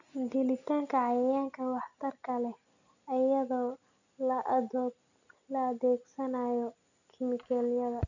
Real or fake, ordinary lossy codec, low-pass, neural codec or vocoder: real; none; 7.2 kHz; none